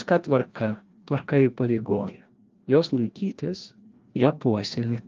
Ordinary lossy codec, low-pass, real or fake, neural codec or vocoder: Opus, 32 kbps; 7.2 kHz; fake; codec, 16 kHz, 1 kbps, FreqCodec, larger model